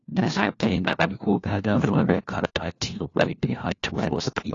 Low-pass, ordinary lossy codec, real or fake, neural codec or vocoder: 7.2 kHz; AAC, 32 kbps; fake; codec, 16 kHz, 1 kbps, FunCodec, trained on LibriTTS, 50 frames a second